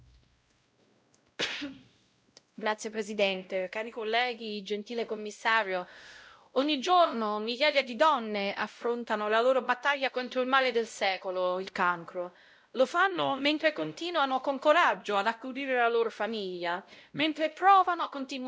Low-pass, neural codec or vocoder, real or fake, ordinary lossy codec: none; codec, 16 kHz, 0.5 kbps, X-Codec, WavLM features, trained on Multilingual LibriSpeech; fake; none